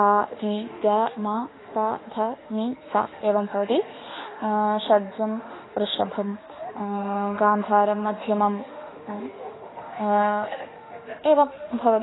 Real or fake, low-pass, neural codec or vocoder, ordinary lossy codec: fake; 7.2 kHz; autoencoder, 48 kHz, 32 numbers a frame, DAC-VAE, trained on Japanese speech; AAC, 16 kbps